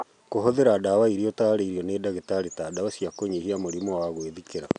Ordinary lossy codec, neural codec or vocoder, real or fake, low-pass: none; none; real; 9.9 kHz